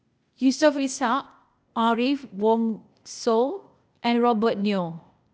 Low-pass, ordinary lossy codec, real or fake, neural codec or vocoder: none; none; fake; codec, 16 kHz, 0.8 kbps, ZipCodec